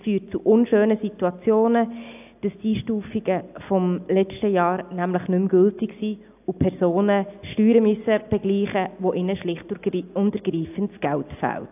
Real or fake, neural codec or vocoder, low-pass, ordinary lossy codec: real; none; 3.6 kHz; AAC, 32 kbps